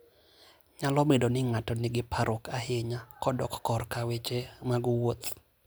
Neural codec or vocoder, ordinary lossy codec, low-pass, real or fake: none; none; none; real